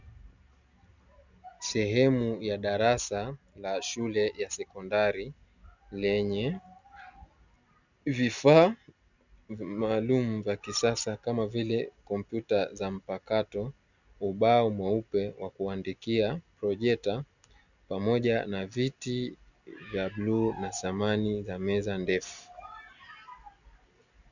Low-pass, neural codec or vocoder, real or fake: 7.2 kHz; none; real